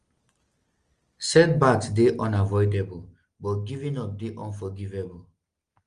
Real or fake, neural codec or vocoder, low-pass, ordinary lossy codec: real; none; 9.9 kHz; Opus, 24 kbps